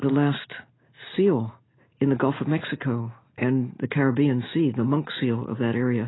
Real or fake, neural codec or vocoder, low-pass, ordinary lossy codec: real; none; 7.2 kHz; AAC, 16 kbps